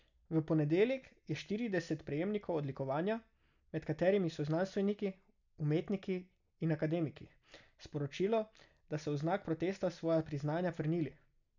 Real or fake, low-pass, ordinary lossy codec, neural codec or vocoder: real; 7.2 kHz; none; none